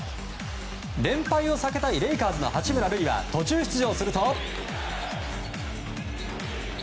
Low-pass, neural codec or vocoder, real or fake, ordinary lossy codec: none; none; real; none